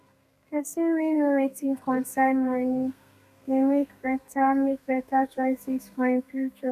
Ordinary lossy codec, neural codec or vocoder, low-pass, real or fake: none; codec, 32 kHz, 1.9 kbps, SNAC; 14.4 kHz; fake